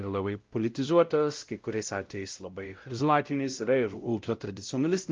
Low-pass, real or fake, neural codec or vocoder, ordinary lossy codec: 7.2 kHz; fake; codec, 16 kHz, 0.5 kbps, X-Codec, WavLM features, trained on Multilingual LibriSpeech; Opus, 32 kbps